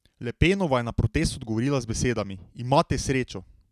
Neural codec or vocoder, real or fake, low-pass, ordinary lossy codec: none; real; 14.4 kHz; none